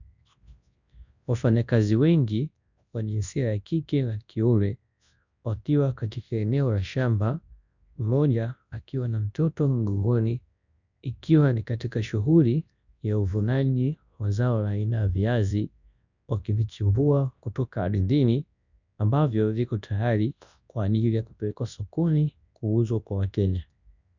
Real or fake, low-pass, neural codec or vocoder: fake; 7.2 kHz; codec, 24 kHz, 0.9 kbps, WavTokenizer, large speech release